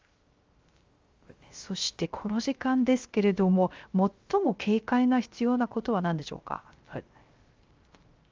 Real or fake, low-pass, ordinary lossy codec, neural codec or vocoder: fake; 7.2 kHz; Opus, 32 kbps; codec, 16 kHz, 0.3 kbps, FocalCodec